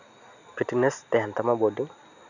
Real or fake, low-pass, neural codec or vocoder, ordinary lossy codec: real; 7.2 kHz; none; none